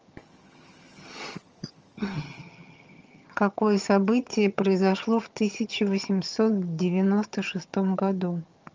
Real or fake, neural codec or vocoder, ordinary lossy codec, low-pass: fake; vocoder, 22.05 kHz, 80 mel bands, HiFi-GAN; Opus, 24 kbps; 7.2 kHz